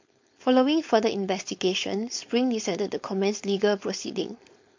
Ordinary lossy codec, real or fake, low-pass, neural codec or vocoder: MP3, 48 kbps; fake; 7.2 kHz; codec, 16 kHz, 4.8 kbps, FACodec